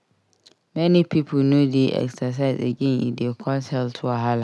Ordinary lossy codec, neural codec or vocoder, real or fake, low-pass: none; none; real; none